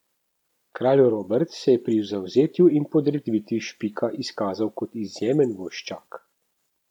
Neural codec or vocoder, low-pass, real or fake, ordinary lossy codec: none; 19.8 kHz; real; none